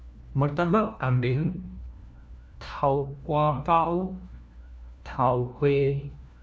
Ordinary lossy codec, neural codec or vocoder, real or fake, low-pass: none; codec, 16 kHz, 1 kbps, FunCodec, trained on LibriTTS, 50 frames a second; fake; none